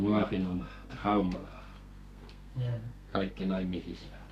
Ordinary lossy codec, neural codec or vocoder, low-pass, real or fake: none; codec, 32 kHz, 1.9 kbps, SNAC; 14.4 kHz; fake